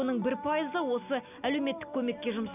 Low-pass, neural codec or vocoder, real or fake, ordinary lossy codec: 3.6 kHz; none; real; none